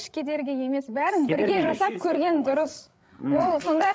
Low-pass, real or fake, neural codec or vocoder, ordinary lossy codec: none; fake; codec, 16 kHz, 8 kbps, FreqCodec, larger model; none